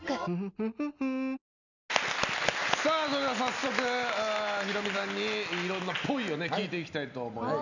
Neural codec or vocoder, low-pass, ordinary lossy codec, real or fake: none; 7.2 kHz; none; real